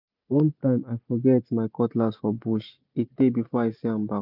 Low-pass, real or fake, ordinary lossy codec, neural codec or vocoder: 5.4 kHz; real; none; none